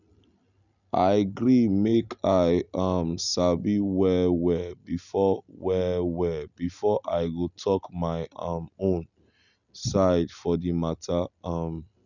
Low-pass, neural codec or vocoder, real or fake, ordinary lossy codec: 7.2 kHz; none; real; none